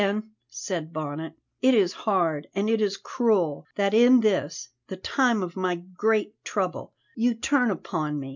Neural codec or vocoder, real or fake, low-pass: none; real; 7.2 kHz